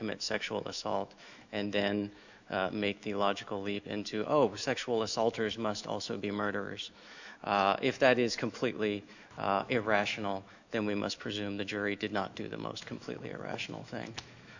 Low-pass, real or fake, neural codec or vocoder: 7.2 kHz; fake; autoencoder, 48 kHz, 128 numbers a frame, DAC-VAE, trained on Japanese speech